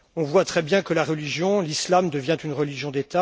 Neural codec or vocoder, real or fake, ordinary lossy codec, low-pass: none; real; none; none